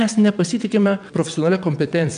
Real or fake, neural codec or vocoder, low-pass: fake; vocoder, 22.05 kHz, 80 mel bands, WaveNeXt; 9.9 kHz